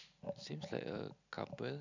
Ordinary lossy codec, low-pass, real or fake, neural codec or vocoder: none; 7.2 kHz; real; none